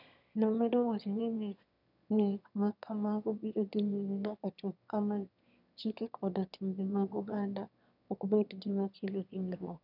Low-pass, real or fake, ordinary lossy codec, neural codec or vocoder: 5.4 kHz; fake; none; autoencoder, 22.05 kHz, a latent of 192 numbers a frame, VITS, trained on one speaker